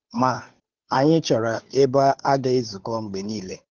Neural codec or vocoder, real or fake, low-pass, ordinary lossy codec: codec, 16 kHz, 2 kbps, FunCodec, trained on Chinese and English, 25 frames a second; fake; 7.2 kHz; Opus, 24 kbps